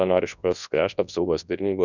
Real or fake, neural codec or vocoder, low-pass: fake; codec, 24 kHz, 0.9 kbps, WavTokenizer, large speech release; 7.2 kHz